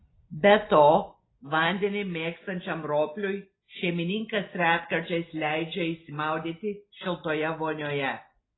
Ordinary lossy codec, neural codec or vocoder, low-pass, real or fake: AAC, 16 kbps; none; 7.2 kHz; real